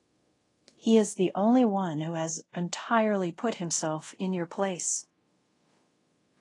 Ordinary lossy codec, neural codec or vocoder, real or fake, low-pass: AAC, 32 kbps; codec, 24 kHz, 0.5 kbps, DualCodec; fake; 10.8 kHz